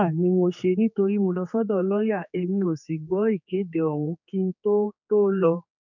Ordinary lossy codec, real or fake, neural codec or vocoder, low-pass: none; fake; codec, 16 kHz, 4 kbps, X-Codec, HuBERT features, trained on general audio; 7.2 kHz